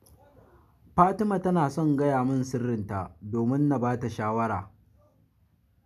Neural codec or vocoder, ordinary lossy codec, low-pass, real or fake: none; none; 14.4 kHz; real